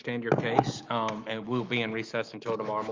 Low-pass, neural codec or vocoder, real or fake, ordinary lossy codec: 7.2 kHz; autoencoder, 48 kHz, 128 numbers a frame, DAC-VAE, trained on Japanese speech; fake; Opus, 32 kbps